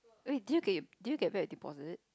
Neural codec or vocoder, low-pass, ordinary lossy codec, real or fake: none; none; none; real